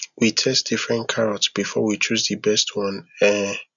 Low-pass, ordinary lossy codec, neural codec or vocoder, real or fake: 7.2 kHz; none; none; real